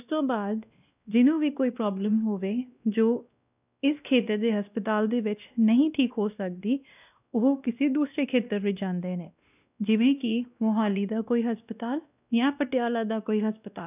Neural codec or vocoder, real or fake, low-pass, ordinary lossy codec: codec, 16 kHz, 1 kbps, X-Codec, WavLM features, trained on Multilingual LibriSpeech; fake; 3.6 kHz; none